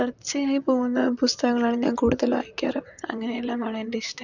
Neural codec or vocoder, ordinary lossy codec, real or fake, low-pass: vocoder, 44.1 kHz, 128 mel bands, Pupu-Vocoder; none; fake; 7.2 kHz